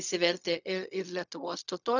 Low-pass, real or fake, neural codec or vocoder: 7.2 kHz; fake; codec, 16 kHz, 0.4 kbps, LongCat-Audio-Codec